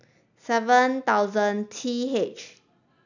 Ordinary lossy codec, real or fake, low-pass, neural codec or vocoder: none; real; 7.2 kHz; none